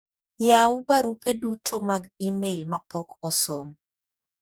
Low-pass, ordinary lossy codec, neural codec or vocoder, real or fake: none; none; codec, 44.1 kHz, 2.6 kbps, DAC; fake